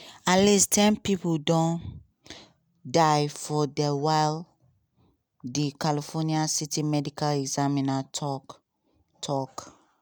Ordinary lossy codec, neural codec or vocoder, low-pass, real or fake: none; none; none; real